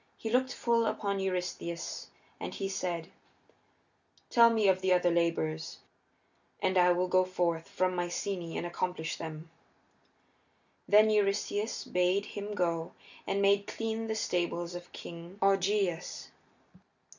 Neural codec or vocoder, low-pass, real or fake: none; 7.2 kHz; real